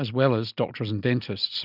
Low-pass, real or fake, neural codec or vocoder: 5.4 kHz; real; none